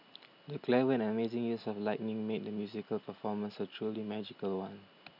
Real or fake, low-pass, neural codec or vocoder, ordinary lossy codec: real; 5.4 kHz; none; none